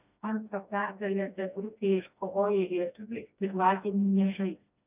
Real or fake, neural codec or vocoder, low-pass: fake; codec, 16 kHz, 1 kbps, FreqCodec, smaller model; 3.6 kHz